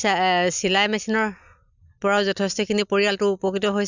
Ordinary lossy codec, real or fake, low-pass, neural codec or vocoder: none; real; 7.2 kHz; none